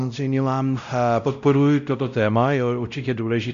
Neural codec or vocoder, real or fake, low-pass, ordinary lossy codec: codec, 16 kHz, 0.5 kbps, X-Codec, WavLM features, trained on Multilingual LibriSpeech; fake; 7.2 kHz; AAC, 96 kbps